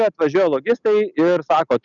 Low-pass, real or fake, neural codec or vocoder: 7.2 kHz; real; none